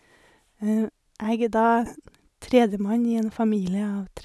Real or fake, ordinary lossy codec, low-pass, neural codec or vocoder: real; none; none; none